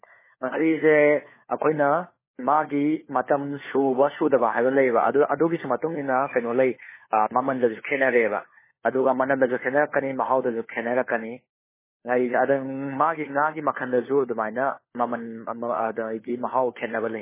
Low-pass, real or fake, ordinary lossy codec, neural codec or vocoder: 3.6 kHz; fake; MP3, 16 kbps; codec, 16 kHz, 4 kbps, FunCodec, trained on LibriTTS, 50 frames a second